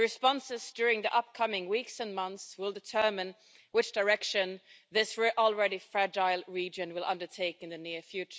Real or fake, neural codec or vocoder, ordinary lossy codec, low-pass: real; none; none; none